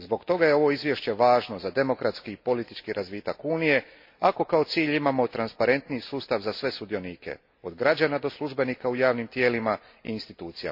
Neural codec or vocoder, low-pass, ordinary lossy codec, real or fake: none; 5.4 kHz; MP3, 32 kbps; real